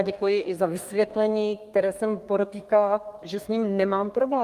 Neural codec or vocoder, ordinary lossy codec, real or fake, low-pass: codec, 32 kHz, 1.9 kbps, SNAC; Opus, 32 kbps; fake; 14.4 kHz